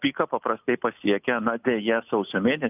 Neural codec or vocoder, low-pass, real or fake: none; 3.6 kHz; real